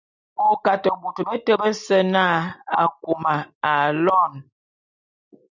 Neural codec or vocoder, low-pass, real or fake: none; 7.2 kHz; real